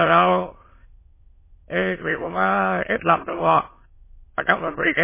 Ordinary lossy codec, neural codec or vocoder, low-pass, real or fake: MP3, 16 kbps; autoencoder, 22.05 kHz, a latent of 192 numbers a frame, VITS, trained on many speakers; 3.6 kHz; fake